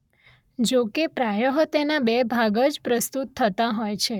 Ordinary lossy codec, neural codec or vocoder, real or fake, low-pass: none; codec, 44.1 kHz, 7.8 kbps, Pupu-Codec; fake; 19.8 kHz